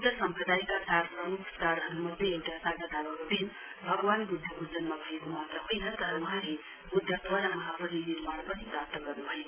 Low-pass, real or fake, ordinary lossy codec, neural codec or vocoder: 3.6 kHz; real; Opus, 32 kbps; none